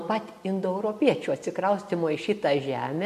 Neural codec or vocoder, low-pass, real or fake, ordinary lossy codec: none; 14.4 kHz; real; MP3, 64 kbps